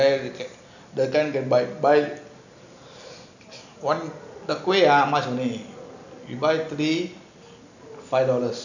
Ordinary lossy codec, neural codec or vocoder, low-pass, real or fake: none; none; 7.2 kHz; real